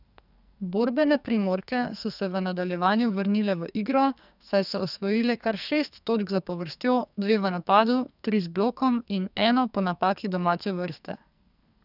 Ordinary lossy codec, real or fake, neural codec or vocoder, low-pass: none; fake; codec, 44.1 kHz, 2.6 kbps, SNAC; 5.4 kHz